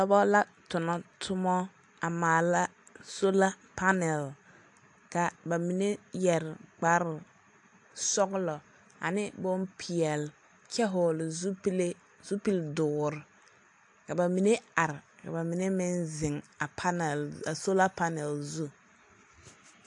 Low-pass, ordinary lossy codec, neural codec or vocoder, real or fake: 10.8 kHz; AAC, 64 kbps; none; real